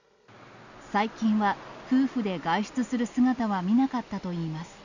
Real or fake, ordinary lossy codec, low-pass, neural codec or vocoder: real; none; 7.2 kHz; none